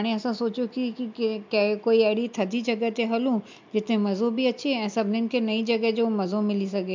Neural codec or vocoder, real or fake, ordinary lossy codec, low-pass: none; real; MP3, 64 kbps; 7.2 kHz